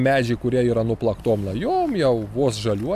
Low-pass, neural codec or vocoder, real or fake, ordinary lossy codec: 14.4 kHz; none; real; AAC, 96 kbps